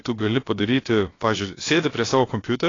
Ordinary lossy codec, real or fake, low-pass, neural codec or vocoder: AAC, 32 kbps; fake; 7.2 kHz; codec, 16 kHz, about 1 kbps, DyCAST, with the encoder's durations